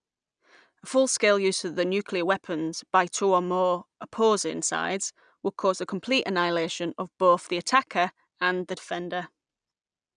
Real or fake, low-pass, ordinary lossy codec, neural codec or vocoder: real; 9.9 kHz; none; none